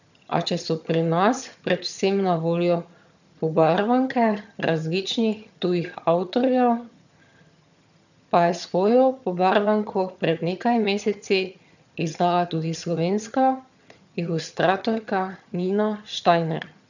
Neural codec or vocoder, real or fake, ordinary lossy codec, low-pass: vocoder, 22.05 kHz, 80 mel bands, HiFi-GAN; fake; none; 7.2 kHz